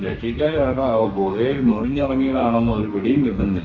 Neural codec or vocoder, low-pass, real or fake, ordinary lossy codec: codec, 32 kHz, 1.9 kbps, SNAC; 7.2 kHz; fake; none